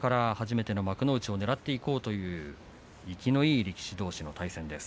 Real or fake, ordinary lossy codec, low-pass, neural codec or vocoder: real; none; none; none